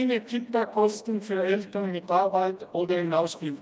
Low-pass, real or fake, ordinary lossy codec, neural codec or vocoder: none; fake; none; codec, 16 kHz, 1 kbps, FreqCodec, smaller model